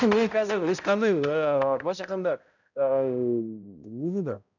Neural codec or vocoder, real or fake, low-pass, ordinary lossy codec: codec, 16 kHz, 0.5 kbps, X-Codec, HuBERT features, trained on balanced general audio; fake; 7.2 kHz; none